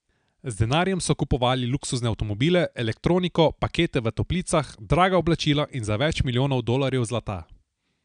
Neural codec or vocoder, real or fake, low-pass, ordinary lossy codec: none; real; 9.9 kHz; none